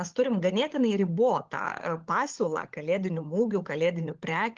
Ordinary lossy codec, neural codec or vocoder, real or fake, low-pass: Opus, 16 kbps; codec, 16 kHz, 16 kbps, FunCodec, trained on LibriTTS, 50 frames a second; fake; 7.2 kHz